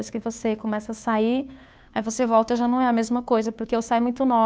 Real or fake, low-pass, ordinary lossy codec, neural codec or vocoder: fake; none; none; codec, 16 kHz, 2 kbps, FunCodec, trained on Chinese and English, 25 frames a second